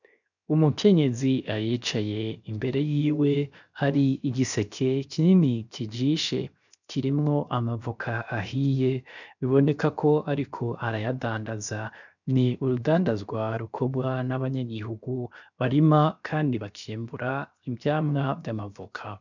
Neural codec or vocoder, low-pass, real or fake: codec, 16 kHz, 0.7 kbps, FocalCodec; 7.2 kHz; fake